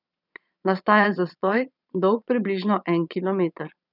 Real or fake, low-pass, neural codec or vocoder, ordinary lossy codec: fake; 5.4 kHz; vocoder, 22.05 kHz, 80 mel bands, Vocos; none